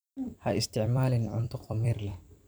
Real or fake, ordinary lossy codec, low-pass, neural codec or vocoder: fake; none; none; vocoder, 44.1 kHz, 128 mel bands every 256 samples, BigVGAN v2